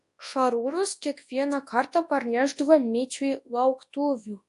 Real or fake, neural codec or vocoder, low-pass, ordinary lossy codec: fake; codec, 24 kHz, 0.9 kbps, WavTokenizer, large speech release; 10.8 kHz; AAC, 64 kbps